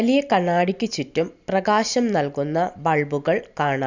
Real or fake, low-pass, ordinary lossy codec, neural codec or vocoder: real; 7.2 kHz; Opus, 64 kbps; none